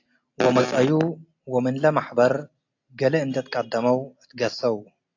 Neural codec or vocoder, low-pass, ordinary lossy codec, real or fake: none; 7.2 kHz; AAC, 48 kbps; real